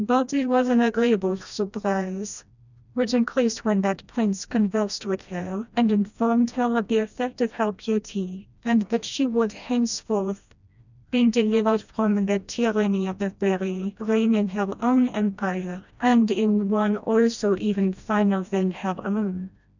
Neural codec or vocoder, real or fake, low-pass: codec, 16 kHz, 1 kbps, FreqCodec, smaller model; fake; 7.2 kHz